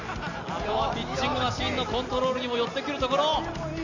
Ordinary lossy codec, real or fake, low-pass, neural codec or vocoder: none; real; 7.2 kHz; none